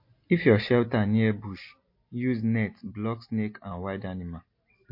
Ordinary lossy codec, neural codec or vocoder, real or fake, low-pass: MP3, 32 kbps; none; real; 5.4 kHz